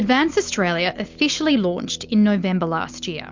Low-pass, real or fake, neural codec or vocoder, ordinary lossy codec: 7.2 kHz; real; none; MP3, 64 kbps